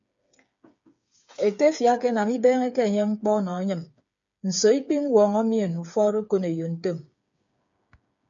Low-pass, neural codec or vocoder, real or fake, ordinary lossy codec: 7.2 kHz; codec, 16 kHz, 8 kbps, FreqCodec, smaller model; fake; MP3, 64 kbps